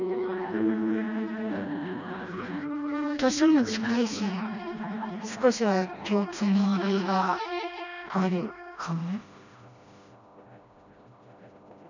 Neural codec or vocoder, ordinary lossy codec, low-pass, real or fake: codec, 16 kHz, 1 kbps, FreqCodec, smaller model; none; 7.2 kHz; fake